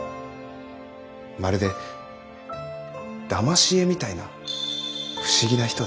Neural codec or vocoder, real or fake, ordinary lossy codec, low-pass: none; real; none; none